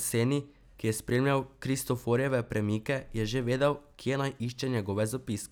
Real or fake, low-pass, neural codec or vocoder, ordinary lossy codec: real; none; none; none